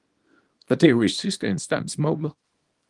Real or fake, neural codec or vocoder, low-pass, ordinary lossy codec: fake; codec, 24 kHz, 0.9 kbps, WavTokenizer, small release; 10.8 kHz; Opus, 24 kbps